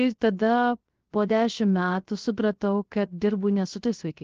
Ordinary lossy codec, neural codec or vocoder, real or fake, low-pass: Opus, 16 kbps; codec, 16 kHz, 0.3 kbps, FocalCodec; fake; 7.2 kHz